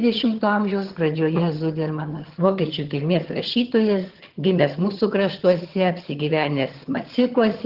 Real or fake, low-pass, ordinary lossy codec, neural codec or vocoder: fake; 5.4 kHz; Opus, 16 kbps; vocoder, 22.05 kHz, 80 mel bands, HiFi-GAN